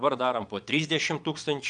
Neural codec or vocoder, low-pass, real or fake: vocoder, 22.05 kHz, 80 mel bands, Vocos; 9.9 kHz; fake